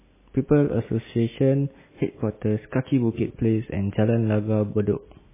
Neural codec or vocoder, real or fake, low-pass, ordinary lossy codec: none; real; 3.6 kHz; MP3, 16 kbps